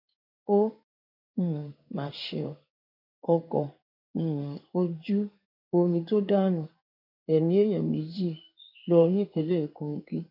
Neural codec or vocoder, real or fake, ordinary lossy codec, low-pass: codec, 16 kHz in and 24 kHz out, 1 kbps, XY-Tokenizer; fake; none; 5.4 kHz